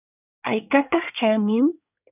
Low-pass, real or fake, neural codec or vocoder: 3.6 kHz; fake; codec, 24 kHz, 1 kbps, SNAC